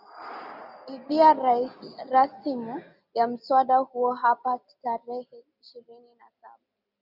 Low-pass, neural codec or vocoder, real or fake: 5.4 kHz; none; real